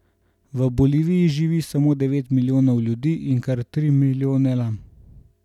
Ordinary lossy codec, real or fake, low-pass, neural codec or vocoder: none; real; 19.8 kHz; none